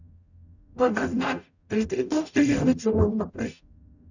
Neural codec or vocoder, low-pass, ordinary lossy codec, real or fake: codec, 44.1 kHz, 0.9 kbps, DAC; 7.2 kHz; none; fake